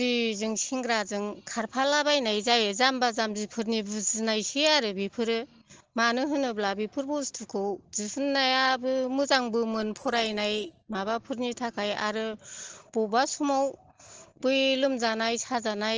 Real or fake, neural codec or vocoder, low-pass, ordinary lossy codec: real; none; 7.2 kHz; Opus, 16 kbps